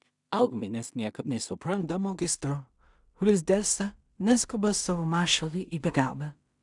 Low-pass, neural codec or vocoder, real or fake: 10.8 kHz; codec, 16 kHz in and 24 kHz out, 0.4 kbps, LongCat-Audio-Codec, two codebook decoder; fake